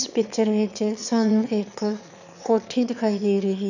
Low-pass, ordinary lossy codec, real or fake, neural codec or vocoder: 7.2 kHz; none; fake; autoencoder, 22.05 kHz, a latent of 192 numbers a frame, VITS, trained on one speaker